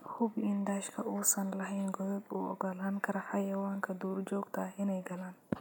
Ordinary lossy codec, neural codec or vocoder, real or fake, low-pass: none; none; real; none